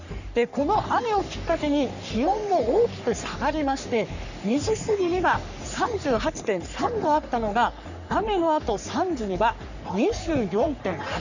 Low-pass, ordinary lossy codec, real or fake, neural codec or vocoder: 7.2 kHz; none; fake; codec, 44.1 kHz, 3.4 kbps, Pupu-Codec